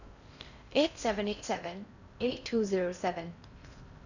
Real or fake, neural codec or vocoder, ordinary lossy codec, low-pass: fake; codec, 16 kHz in and 24 kHz out, 0.6 kbps, FocalCodec, streaming, 4096 codes; none; 7.2 kHz